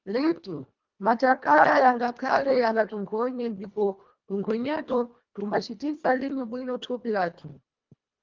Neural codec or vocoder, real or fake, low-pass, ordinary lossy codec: codec, 24 kHz, 1.5 kbps, HILCodec; fake; 7.2 kHz; Opus, 32 kbps